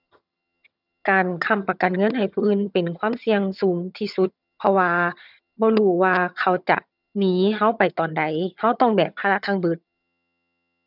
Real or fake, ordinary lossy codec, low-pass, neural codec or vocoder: fake; none; 5.4 kHz; vocoder, 22.05 kHz, 80 mel bands, HiFi-GAN